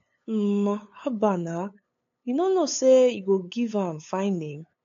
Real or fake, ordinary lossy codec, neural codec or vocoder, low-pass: fake; AAC, 48 kbps; codec, 16 kHz, 8 kbps, FunCodec, trained on LibriTTS, 25 frames a second; 7.2 kHz